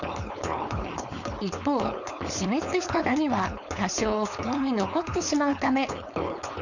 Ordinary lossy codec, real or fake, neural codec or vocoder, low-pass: none; fake; codec, 16 kHz, 4.8 kbps, FACodec; 7.2 kHz